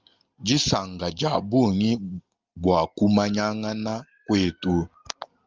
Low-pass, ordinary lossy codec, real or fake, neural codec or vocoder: 7.2 kHz; Opus, 24 kbps; real; none